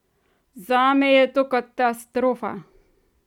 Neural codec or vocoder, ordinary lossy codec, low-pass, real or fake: none; none; 19.8 kHz; real